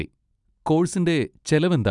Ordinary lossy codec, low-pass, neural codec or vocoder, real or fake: none; 10.8 kHz; none; real